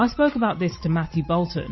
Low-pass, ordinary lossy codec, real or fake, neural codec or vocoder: 7.2 kHz; MP3, 24 kbps; real; none